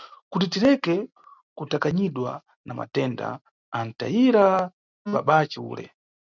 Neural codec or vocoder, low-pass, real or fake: none; 7.2 kHz; real